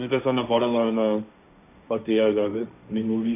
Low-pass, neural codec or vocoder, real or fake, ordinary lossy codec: 3.6 kHz; codec, 16 kHz, 1.1 kbps, Voila-Tokenizer; fake; none